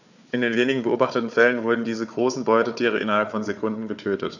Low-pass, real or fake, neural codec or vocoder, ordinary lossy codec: 7.2 kHz; fake; codec, 16 kHz, 4 kbps, FunCodec, trained on Chinese and English, 50 frames a second; none